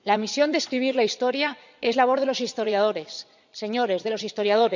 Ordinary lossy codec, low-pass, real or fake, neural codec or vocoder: none; 7.2 kHz; fake; vocoder, 22.05 kHz, 80 mel bands, Vocos